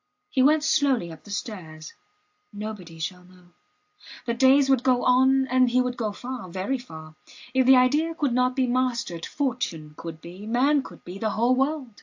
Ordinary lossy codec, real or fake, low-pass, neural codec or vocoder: AAC, 48 kbps; real; 7.2 kHz; none